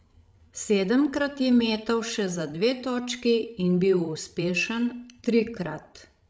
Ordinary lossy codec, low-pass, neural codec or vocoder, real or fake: none; none; codec, 16 kHz, 16 kbps, FreqCodec, larger model; fake